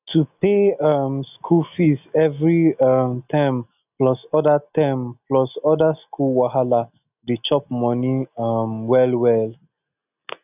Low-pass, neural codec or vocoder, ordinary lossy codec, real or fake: 3.6 kHz; none; none; real